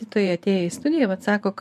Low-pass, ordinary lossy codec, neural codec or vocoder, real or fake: 14.4 kHz; MP3, 96 kbps; vocoder, 44.1 kHz, 128 mel bands every 512 samples, BigVGAN v2; fake